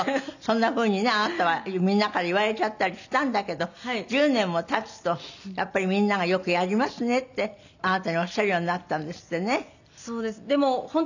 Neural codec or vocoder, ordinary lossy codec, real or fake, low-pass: none; none; real; 7.2 kHz